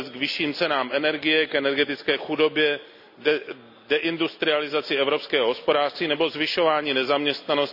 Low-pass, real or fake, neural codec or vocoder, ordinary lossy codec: 5.4 kHz; real; none; none